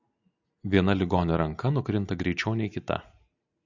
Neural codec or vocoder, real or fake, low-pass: none; real; 7.2 kHz